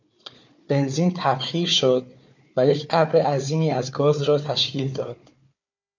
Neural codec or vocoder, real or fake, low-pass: codec, 16 kHz, 4 kbps, FunCodec, trained on Chinese and English, 50 frames a second; fake; 7.2 kHz